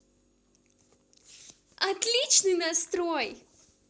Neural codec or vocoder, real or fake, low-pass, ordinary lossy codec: none; real; none; none